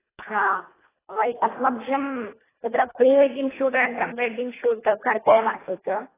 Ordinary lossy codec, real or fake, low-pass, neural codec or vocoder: AAC, 16 kbps; fake; 3.6 kHz; codec, 24 kHz, 1.5 kbps, HILCodec